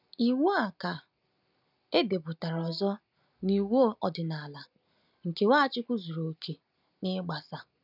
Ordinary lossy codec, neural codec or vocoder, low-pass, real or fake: none; none; 5.4 kHz; real